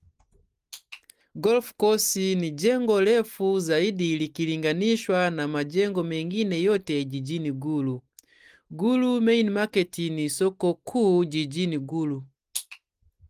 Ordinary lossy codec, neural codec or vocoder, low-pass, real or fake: Opus, 24 kbps; none; 14.4 kHz; real